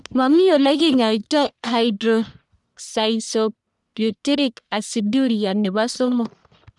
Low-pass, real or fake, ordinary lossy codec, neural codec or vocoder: 10.8 kHz; fake; none; codec, 44.1 kHz, 1.7 kbps, Pupu-Codec